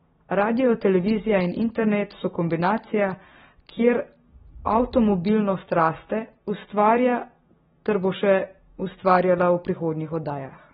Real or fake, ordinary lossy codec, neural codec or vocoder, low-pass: real; AAC, 16 kbps; none; 19.8 kHz